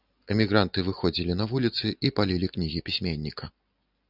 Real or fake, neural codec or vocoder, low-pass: real; none; 5.4 kHz